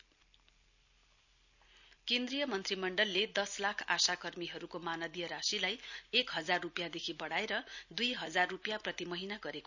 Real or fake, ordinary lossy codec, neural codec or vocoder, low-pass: real; none; none; 7.2 kHz